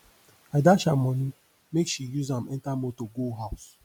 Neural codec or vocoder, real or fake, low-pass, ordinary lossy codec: none; real; 19.8 kHz; MP3, 96 kbps